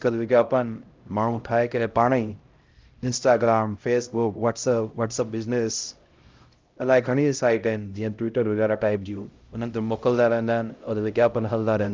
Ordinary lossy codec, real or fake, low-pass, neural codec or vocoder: Opus, 32 kbps; fake; 7.2 kHz; codec, 16 kHz, 0.5 kbps, X-Codec, HuBERT features, trained on LibriSpeech